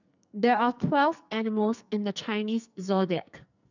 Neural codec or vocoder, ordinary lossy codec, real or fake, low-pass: codec, 44.1 kHz, 2.6 kbps, SNAC; none; fake; 7.2 kHz